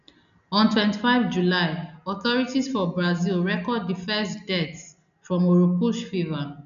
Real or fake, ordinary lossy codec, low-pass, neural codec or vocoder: real; none; 7.2 kHz; none